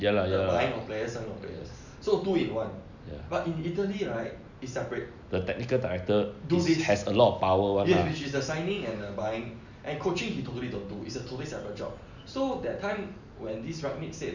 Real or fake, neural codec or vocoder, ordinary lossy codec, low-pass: real; none; none; 7.2 kHz